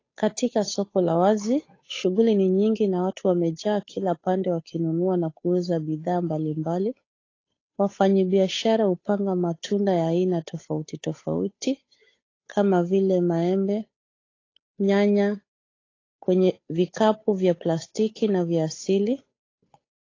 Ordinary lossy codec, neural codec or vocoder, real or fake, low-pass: AAC, 32 kbps; codec, 16 kHz, 8 kbps, FunCodec, trained on Chinese and English, 25 frames a second; fake; 7.2 kHz